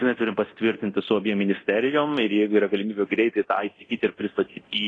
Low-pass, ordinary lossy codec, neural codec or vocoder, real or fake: 9.9 kHz; AAC, 32 kbps; codec, 24 kHz, 0.9 kbps, DualCodec; fake